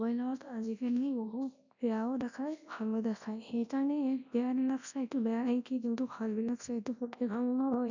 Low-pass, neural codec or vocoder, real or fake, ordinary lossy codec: 7.2 kHz; codec, 24 kHz, 0.9 kbps, WavTokenizer, large speech release; fake; none